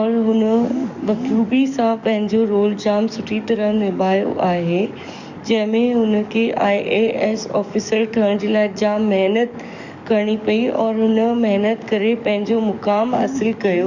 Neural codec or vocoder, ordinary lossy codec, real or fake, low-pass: codec, 44.1 kHz, 7.8 kbps, DAC; none; fake; 7.2 kHz